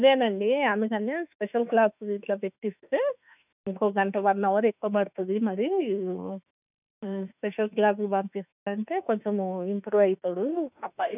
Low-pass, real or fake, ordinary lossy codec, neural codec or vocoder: 3.6 kHz; fake; none; autoencoder, 48 kHz, 32 numbers a frame, DAC-VAE, trained on Japanese speech